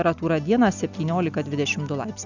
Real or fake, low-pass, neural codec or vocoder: real; 7.2 kHz; none